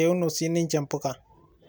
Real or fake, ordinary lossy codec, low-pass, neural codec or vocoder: real; none; none; none